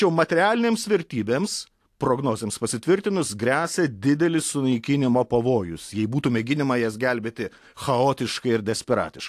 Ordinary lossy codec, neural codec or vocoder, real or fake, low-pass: AAC, 64 kbps; none; real; 14.4 kHz